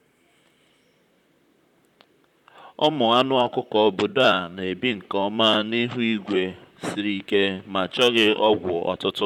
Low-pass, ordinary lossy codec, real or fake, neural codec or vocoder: 19.8 kHz; none; fake; vocoder, 44.1 kHz, 128 mel bands, Pupu-Vocoder